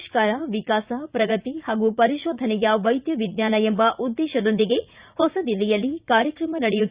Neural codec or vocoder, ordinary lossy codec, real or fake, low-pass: vocoder, 44.1 kHz, 80 mel bands, Vocos; Opus, 64 kbps; fake; 3.6 kHz